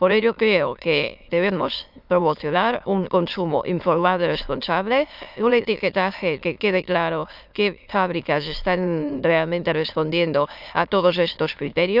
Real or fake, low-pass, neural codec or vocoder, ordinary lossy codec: fake; 5.4 kHz; autoencoder, 22.05 kHz, a latent of 192 numbers a frame, VITS, trained on many speakers; none